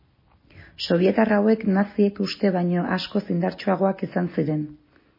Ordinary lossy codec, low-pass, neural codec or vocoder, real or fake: MP3, 24 kbps; 5.4 kHz; none; real